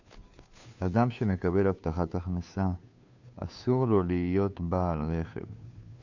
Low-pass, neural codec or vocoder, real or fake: 7.2 kHz; codec, 16 kHz, 2 kbps, FunCodec, trained on Chinese and English, 25 frames a second; fake